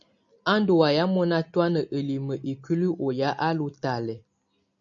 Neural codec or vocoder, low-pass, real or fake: none; 7.2 kHz; real